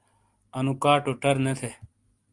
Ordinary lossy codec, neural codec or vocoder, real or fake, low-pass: Opus, 32 kbps; none; real; 10.8 kHz